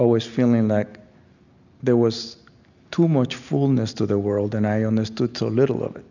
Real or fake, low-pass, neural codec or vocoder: real; 7.2 kHz; none